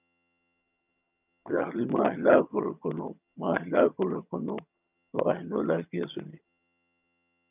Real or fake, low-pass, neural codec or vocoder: fake; 3.6 kHz; vocoder, 22.05 kHz, 80 mel bands, HiFi-GAN